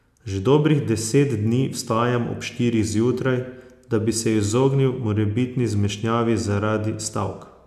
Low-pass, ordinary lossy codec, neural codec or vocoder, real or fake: 14.4 kHz; none; none; real